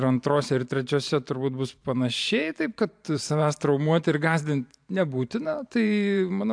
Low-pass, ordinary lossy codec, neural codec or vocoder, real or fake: 9.9 kHz; AAC, 64 kbps; vocoder, 44.1 kHz, 128 mel bands every 512 samples, BigVGAN v2; fake